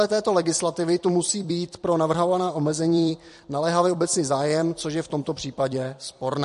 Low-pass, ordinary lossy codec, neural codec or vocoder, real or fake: 14.4 kHz; MP3, 48 kbps; vocoder, 44.1 kHz, 128 mel bands every 256 samples, BigVGAN v2; fake